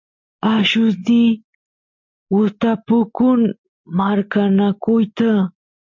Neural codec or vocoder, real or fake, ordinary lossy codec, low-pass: none; real; MP3, 48 kbps; 7.2 kHz